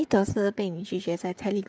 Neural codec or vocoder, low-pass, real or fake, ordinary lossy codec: codec, 16 kHz, 16 kbps, FreqCodec, smaller model; none; fake; none